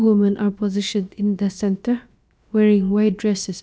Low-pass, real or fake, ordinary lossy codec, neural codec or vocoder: none; fake; none; codec, 16 kHz, about 1 kbps, DyCAST, with the encoder's durations